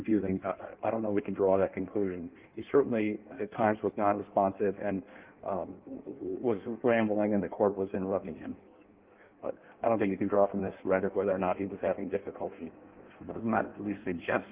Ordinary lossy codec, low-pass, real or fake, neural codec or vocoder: Opus, 32 kbps; 3.6 kHz; fake; codec, 16 kHz in and 24 kHz out, 1.1 kbps, FireRedTTS-2 codec